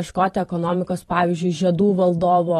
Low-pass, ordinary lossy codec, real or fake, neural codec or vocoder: 19.8 kHz; AAC, 32 kbps; fake; vocoder, 44.1 kHz, 128 mel bands every 512 samples, BigVGAN v2